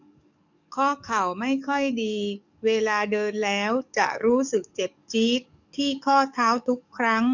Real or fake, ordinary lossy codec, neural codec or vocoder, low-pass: fake; none; codec, 44.1 kHz, 7.8 kbps, DAC; 7.2 kHz